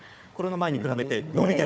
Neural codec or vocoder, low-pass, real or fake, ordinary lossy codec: codec, 16 kHz, 4 kbps, FunCodec, trained on Chinese and English, 50 frames a second; none; fake; none